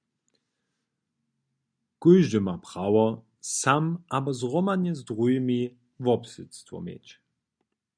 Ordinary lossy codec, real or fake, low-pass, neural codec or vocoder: MP3, 96 kbps; real; 9.9 kHz; none